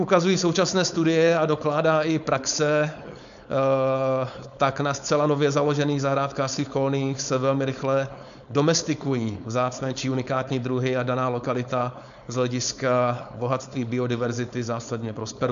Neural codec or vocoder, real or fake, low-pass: codec, 16 kHz, 4.8 kbps, FACodec; fake; 7.2 kHz